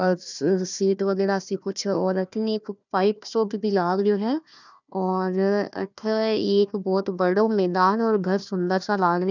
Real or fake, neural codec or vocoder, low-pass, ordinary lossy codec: fake; codec, 16 kHz, 1 kbps, FunCodec, trained on Chinese and English, 50 frames a second; 7.2 kHz; none